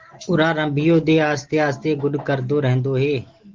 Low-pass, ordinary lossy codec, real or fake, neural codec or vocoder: 7.2 kHz; Opus, 16 kbps; real; none